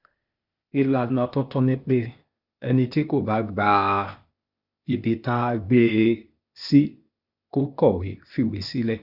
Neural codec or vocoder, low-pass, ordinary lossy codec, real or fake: codec, 16 kHz, 0.8 kbps, ZipCodec; 5.4 kHz; Opus, 64 kbps; fake